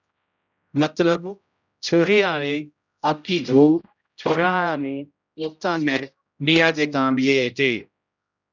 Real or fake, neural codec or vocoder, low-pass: fake; codec, 16 kHz, 0.5 kbps, X-Codec, HuBERT features, trained on general audio; 7.2 kHz